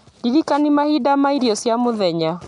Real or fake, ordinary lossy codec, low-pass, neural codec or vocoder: real; none; 10.8 kHz; none